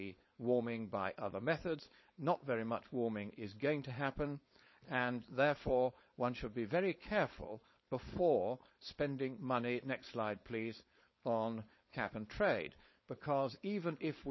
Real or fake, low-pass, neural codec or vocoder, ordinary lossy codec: fake; 7.2 kHz; codec, 16 kHz, 4.8 kbps, FACodec; MP3, 24 kbps